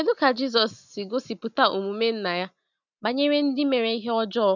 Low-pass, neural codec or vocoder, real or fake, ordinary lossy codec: 7.2 kHz; none; real; none